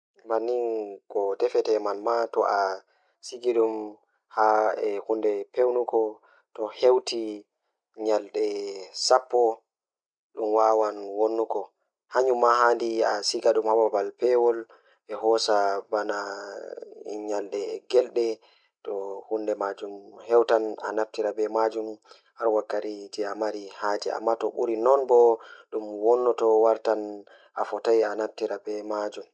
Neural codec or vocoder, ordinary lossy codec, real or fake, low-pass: none; none; real; 9.9 kHz